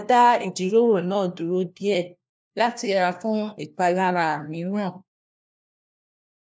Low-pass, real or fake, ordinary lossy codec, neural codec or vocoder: none; fake; none; codec, 16 kHz, 1 kbps, FunCodec, trained on LibriTTS, 50 frames a second